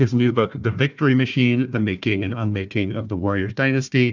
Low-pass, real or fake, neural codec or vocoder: 7.2 kHz; fake; codec, 16 kHz, 1 kbps, FunCodec, trained on Chinese and English, 50 frames a second